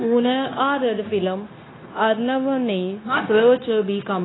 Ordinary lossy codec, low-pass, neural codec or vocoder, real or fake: AAC, 16 kbps; 7.2 kHz; codec, 16 kHz, 0.9 kbps, LongCat-Audio-Codec; fake